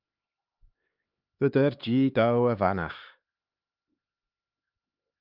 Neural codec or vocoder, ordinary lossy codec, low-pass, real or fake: codec, 16 kHz, 4 kbps, X-Codec, HuBERT features, trained on LibriSpeech; Opus, 24 kbps; 5.4 kHz; fake